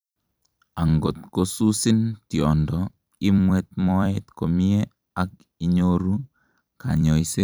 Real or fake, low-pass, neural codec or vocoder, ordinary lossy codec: fake; none; vocoder, 44.1 kHz, 128 mel bands every 256 samples, BigVGAN v2; none